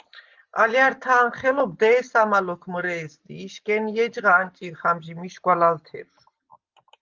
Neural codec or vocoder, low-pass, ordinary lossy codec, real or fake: none; 7.2 kHz; Opus, 32 kbps; real